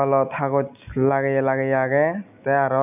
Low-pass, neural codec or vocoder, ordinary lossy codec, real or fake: 3.6 kHz; none; none; real